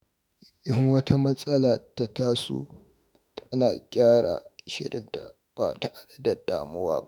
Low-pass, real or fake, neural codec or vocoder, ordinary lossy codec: none; fake; autoencoder, 48 kHz, 32 numbers a frame, DAC-VAE, trained on Japanese speech; none